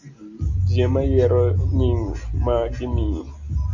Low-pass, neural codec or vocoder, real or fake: 7.2 kHz; none; real